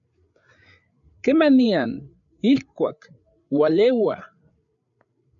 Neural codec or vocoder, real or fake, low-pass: codec, 16 kHz, 8 kbps, FreqCodec, larger model; fake; 7.2 kHz